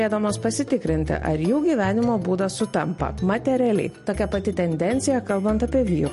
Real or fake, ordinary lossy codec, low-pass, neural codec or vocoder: real; MP3, 48 kbps; 14.4 kHz; none